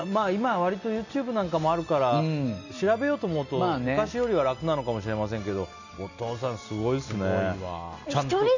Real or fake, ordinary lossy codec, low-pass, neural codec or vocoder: real; none; 7.2 kHz; none